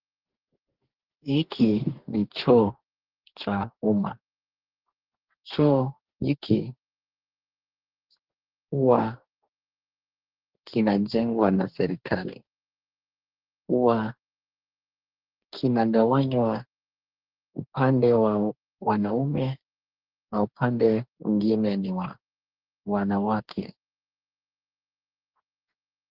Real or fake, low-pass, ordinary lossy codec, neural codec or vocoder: fake; 5.4 kHz; Opus, 16 kbps; codec, 44.1 kHz, 2.6 kbps, DAC